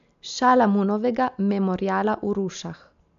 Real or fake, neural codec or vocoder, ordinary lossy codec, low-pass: real; none; MP3, 64 kbps; 7.2 kHz